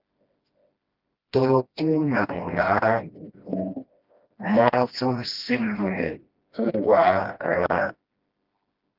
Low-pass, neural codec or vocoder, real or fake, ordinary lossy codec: 5.4 kHz; codec, 16 kHz, 1 kbps, FreqCodec, smaller model; fake; Opus, 32 kbps